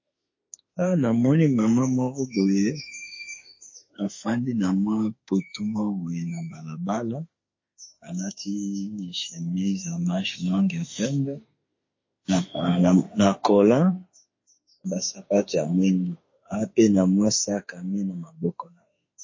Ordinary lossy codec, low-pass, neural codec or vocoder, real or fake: MP3, 32 kbps; 7.2 kHz; autoencoder, 48 kHz, 32 numbers a frame, DAC-VAE, trained on Japanese speech; fake